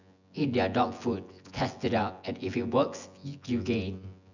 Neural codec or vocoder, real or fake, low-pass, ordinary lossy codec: vocoder, 24 kHz, 100 mel bands, Vocos; fake; 7.2 kHz; none